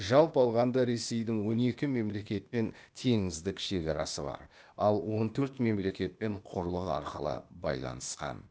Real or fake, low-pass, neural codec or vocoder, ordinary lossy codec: fake; none; codec, 16 kHz, 0.8 kbps, ZipCodec; none